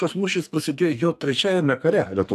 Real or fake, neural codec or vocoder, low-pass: fake; codec, 44.1 kHz, 2.6 kbps, SNAC; 14.4 kHz